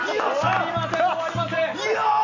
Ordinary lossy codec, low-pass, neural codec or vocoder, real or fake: none; 7.2 kHz; none; real